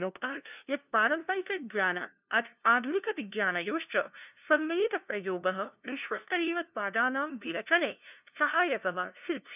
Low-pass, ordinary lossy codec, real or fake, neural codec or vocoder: 3.6 kHz; none; fake; codec, 16 kHz, 0.5 kbps, FunCodec, trained on LibriTTS, 25 frames a second